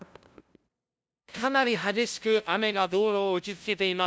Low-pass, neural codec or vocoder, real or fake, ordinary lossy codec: none; codec, 16 kHz, 0.5 kbps, FunCodec, trained on LibriTTS, 25 frames a second; fake; none